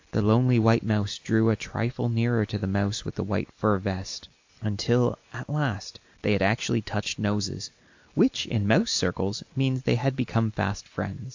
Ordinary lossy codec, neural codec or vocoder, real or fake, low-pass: AAC, 48 kbps; none; real; 7.2 kHz